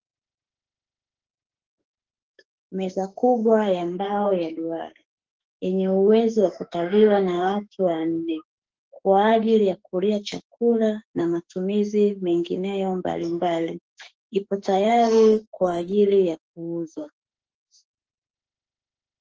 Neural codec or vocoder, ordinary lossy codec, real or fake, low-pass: autoencoder, 48 kHz, 32 numbers a frame, DAC-VAE, trained on Japanese speech; Opus, 16 kbps; fake; 7.2 kHz